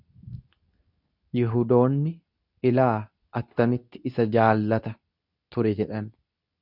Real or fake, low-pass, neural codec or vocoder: fake; 5.4 kHz; codec, 24 kHz, 0.9 kbps, WavTokenizer, medium speech release version 2